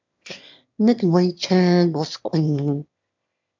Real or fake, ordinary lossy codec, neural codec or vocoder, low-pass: fake; MP3, 64 kbps; autoencoder, 22.05 kHz, a latent of 192 numbers a frame, VITS, trained on one speaker; 7.2 kHz